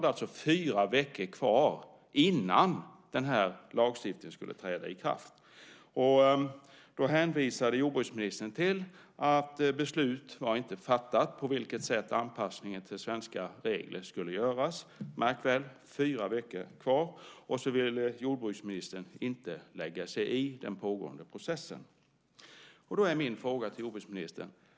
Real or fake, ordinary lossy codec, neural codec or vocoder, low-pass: real; none; none; none